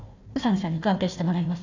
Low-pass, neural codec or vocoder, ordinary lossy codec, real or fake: 7.2 kHz; codec, 16 kHz, 1 kbps, FunCodec, trained on Chinese and English, 50 frames a second; AAC, 48 kbps; fake